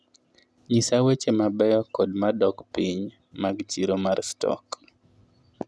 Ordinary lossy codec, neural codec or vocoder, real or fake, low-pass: none; none; real; none